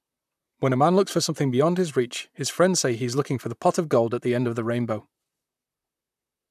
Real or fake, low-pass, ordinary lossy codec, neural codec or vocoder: fake; 14.4 kHz; none; vocoder, 44.1 kHz, 128 mel bands, Pupu-Vocoder